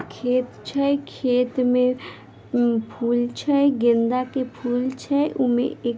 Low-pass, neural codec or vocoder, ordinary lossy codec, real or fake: none; none; none; real